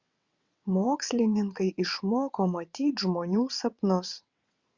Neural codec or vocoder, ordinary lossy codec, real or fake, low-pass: vocoder, 22.05 kHz, 80 mel bands, WaveNeXt; Opus, 64 kbps; fake; 7.2 kHz